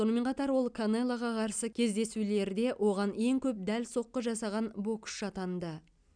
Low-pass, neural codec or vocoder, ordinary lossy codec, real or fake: 9.9 kHz; none; none; real